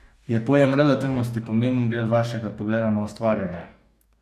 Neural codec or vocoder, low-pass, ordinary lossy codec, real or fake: codec, 44.1 kHz, 2.6 kbps, DAC; 14.4 kHz; none; fake